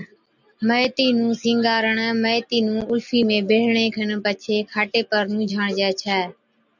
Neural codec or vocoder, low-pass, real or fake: none; 7.2 kHz; real